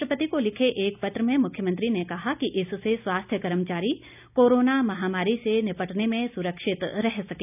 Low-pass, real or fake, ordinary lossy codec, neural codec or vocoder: 3.6 kHz; real; none; none